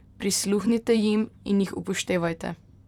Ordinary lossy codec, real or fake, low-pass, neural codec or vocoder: none; fake; 19.8 kHz; vocoder, 44.1 kHz, 128 mel bands, Pupu-Vocoder